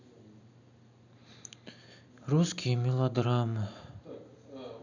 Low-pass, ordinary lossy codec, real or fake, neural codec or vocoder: 7.2 kHz; none; real; none